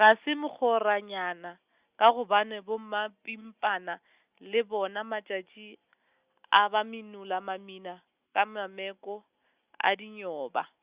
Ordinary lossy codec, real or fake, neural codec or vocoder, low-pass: Opus, 64 kbps; real; none; 3.6 kHz